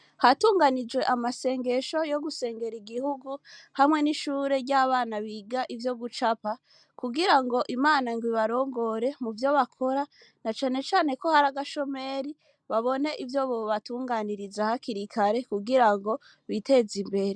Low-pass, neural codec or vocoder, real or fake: 9.9 kHz; none; real